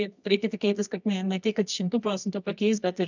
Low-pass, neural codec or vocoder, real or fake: 7.2 kHz; codec, 24 kHz, 0.9 kbps, WavTokenizer, medium music audio release; fake